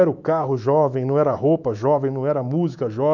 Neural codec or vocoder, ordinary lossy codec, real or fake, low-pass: autoencoder, 48 kHz, 128 numbers a frame, DAC-VAE, trained on Japanese speech; none; fake; 7.2 kHz